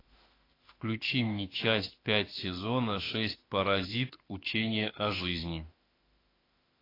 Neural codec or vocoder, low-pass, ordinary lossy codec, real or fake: autoencoder, 48 kHz, 32 numbers a frame, DAC-VAE, trained on Japanese speech; 5.4 kHz; AAC, 24 kbps; fake